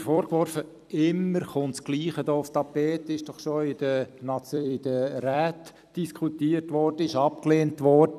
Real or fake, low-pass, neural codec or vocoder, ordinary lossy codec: fake; 14.4 kHz; vocoder, 44.1 kHz, 128 mel bands every 256 samples, BigVGAN v2; none